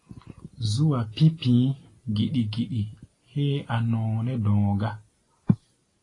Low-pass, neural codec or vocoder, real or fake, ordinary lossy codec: 10.8 kHz; none; real; AAC, 32 kbps